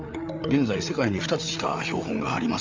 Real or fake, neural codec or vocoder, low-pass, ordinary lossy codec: fake; codec, 16 kHz, 8 kbps, FreqCodec, larger model; 7.2 kHz; Opus, 32 kbps